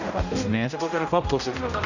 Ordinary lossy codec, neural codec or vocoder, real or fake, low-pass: none; codec, 16 kHz, 0.5 kbps, X-Codec, HuBERT features, trained on general audio; fake; 7.2 kHz